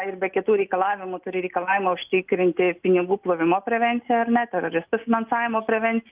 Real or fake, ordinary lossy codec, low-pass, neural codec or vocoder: real; Opus, 32 kbps; 3.6 kHz; none